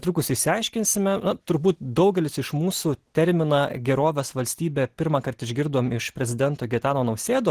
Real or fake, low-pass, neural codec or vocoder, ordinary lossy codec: real; 14.4 kHz; none; Opus, 16 kbps